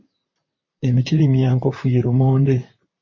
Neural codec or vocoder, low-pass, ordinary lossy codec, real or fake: vocoder, 44.1 kHz, 128 mel bands, Pupu-Vocoder; 7.2 kHz; MP3, 32 kbps; fake